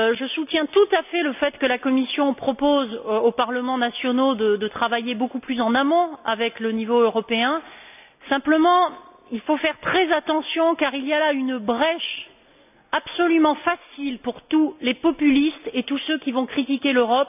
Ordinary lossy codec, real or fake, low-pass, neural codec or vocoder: none; real; 3.6 kHz; none